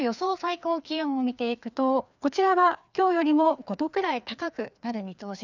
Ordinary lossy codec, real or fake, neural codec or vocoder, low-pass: none; fake; codec, 16 kHz, 2 kbps, FreqCodec, larger model; 7.2 kHz